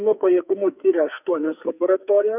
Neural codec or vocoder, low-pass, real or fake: codec, 44.1 kHz, 2.6 kbps, SNAC; 3.6 kHz; fake